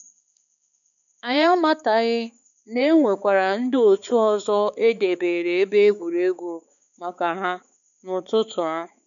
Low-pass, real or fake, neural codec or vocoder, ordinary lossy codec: 7.2 kHz; fake; codec, 16 kHz, 4 kbps, X-Codec, HuBERT features, trained on balanced general audio; none